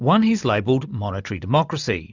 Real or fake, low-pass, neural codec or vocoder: real; 7.2 kHz; none